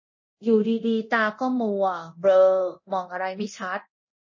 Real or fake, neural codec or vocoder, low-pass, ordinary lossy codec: fake; codec, 24 kHz, 0.9 kbps, DualCodec; 7.2 kHz; MP3, 32 kbps